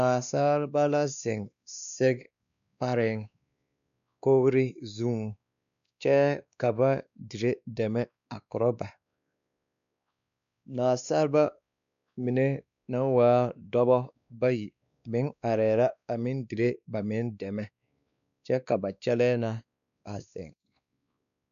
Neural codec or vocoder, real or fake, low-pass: codec, 16 kHz, 2 kbps, X-Codec, WavLM features, trained on Multilingual LibriSpeech; fake; 7.2 kHz